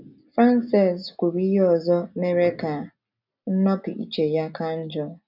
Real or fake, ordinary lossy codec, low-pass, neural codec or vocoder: real; none; 5.4 kHz; none